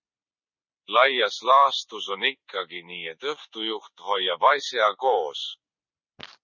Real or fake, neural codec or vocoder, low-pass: fake; vocoder, 44.1 kHz, 128 mel bands every 256 samples, BigVGAN v2; 7.2 kHz